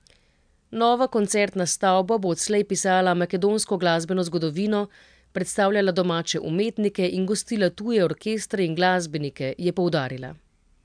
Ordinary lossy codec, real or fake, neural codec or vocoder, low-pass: MP3, 96 kbps; real; none; 9.9 kHz